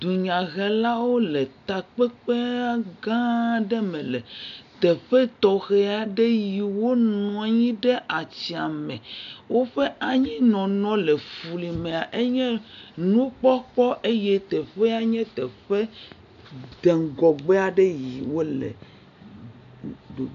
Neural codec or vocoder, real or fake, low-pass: none; real; 7.2 kHz